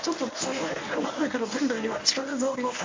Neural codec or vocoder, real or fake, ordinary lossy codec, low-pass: codec, 24 kHz, 0.9 kbps, WavTokenizer, medium speech release version 1; fake; MP3, 48 kbps; 7.2 kHz